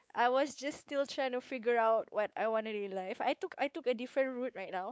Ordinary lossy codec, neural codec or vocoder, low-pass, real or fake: none; codec, 16 kHz, 4 kbps, X-Codec, WavLM features, trained on Multilingual LibriSpeech; none; fake